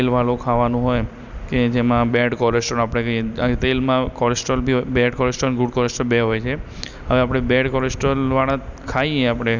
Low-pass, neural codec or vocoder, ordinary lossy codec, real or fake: 7.2 kHz; none; none; real